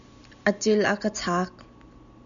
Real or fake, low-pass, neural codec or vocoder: real; 7.2 kHz; none